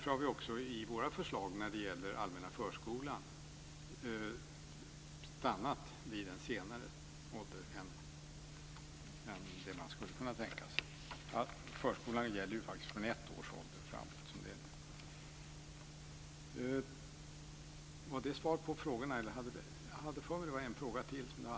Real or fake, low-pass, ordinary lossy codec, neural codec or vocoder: real; none; none; none